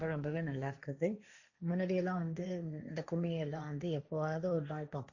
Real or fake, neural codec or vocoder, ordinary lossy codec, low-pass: fake; codec, 16 kHz, 1.1 kbps, Voila-Tokenizer; none; 7.2 kHz